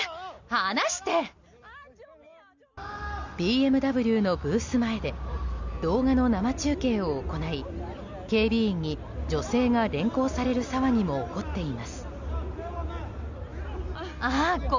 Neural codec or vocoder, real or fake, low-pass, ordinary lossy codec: none; real; 7.2 kHz; Opus, 64 kbps